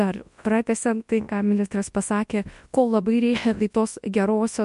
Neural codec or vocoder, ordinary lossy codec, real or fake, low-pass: codec, 24 kHz, 0.9 kbps, WavTokenizer, large speech release; MP3, 64 kbps; fake; 10.8 kHz